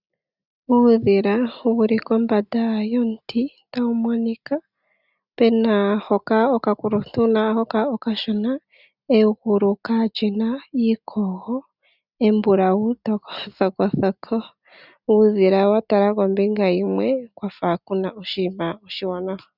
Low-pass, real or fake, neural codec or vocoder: 5.4 kHz; real; none